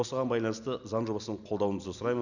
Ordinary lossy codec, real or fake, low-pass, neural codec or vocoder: none; real; 7.2 kHz; none